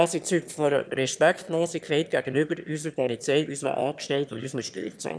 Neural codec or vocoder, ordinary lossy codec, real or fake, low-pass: autoencoder, 22.05 kHz, a latent of 192 numbers a frame, VITS, trained on one speaker; none; fake; none